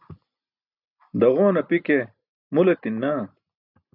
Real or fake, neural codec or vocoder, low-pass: real; none; 5.4 kHz